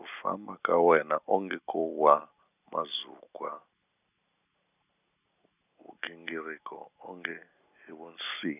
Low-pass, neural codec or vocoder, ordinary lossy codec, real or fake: 3.6 kHz; none; none; real